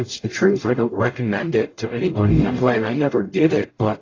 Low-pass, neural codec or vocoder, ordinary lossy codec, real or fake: 7.2 kHz; codec, 44.1 kHz, 0.9 kbps, DAC; AAC, 32 kbps; fake